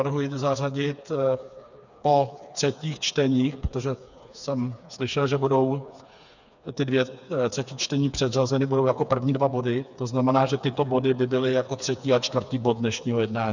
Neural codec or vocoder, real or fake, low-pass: codec, 16 kHz, 4 kbps, FreqCodec, smaller model; fake; 7.2 kHz